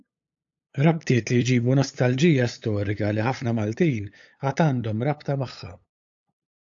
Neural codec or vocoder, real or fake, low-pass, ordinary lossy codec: codec, 16 kHz, 8 kbps, FunCodec, trained on LibriTTS, 25 frames a second; fake; 7.2 kHz; AAC, 64 kbps